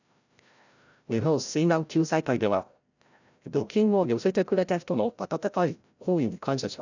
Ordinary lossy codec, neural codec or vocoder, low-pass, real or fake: none; codec, 16 kHz, 0.5 kbps, FreqCodec, larger model; 7.2 kHz; fake